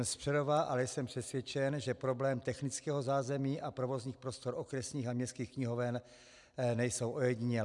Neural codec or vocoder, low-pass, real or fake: none; 10.8 kHz; real